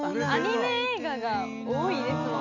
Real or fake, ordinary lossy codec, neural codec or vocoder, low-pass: real; none; none; 7.2 kHz